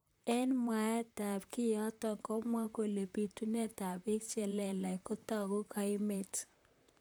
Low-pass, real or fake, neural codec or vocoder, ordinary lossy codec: none; fake; vocoder, 44.1 kHz, 128 mel bands, Pupu-Vocoder; none